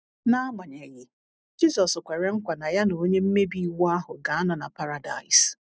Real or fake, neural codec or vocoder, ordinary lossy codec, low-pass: real; none; none; none